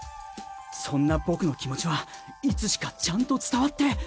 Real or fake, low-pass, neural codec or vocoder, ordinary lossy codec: real; none; none; none